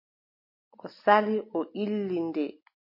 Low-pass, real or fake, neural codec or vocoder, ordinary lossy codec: 5.4 kHz; real; none; MP3, 24 kbps